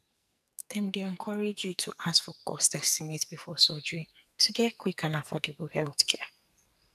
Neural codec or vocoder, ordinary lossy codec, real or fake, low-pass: codec, 44.1 kHz, 2.6 kbps, SNAC; none; fake; 14.4 kHz